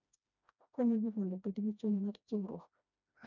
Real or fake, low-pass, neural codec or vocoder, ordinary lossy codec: fake; 7.2 kHz; codec, 16 kHz, 1 kbps, FreqCodec, smaller model; none